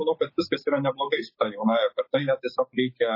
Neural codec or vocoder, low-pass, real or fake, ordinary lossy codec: codec, 16 kHz, 6 kbps, DAC; 5.4 kHz; fake; MP3, 24 kbps